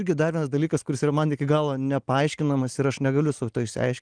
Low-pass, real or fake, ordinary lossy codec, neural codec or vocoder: 9.9 kHz; real; Opus, 24 kbps; none